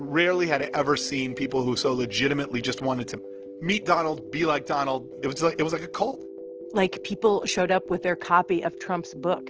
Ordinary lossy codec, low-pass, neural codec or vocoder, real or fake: Opus, 16 kbps; 7.2 kHz; none; real